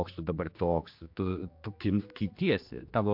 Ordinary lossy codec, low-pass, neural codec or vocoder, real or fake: MP3, 48 kbps; 5.4 kHz; codec, 16 kHz, 2 kbps, X-Codec, HuBERT features, trained on general audio; fake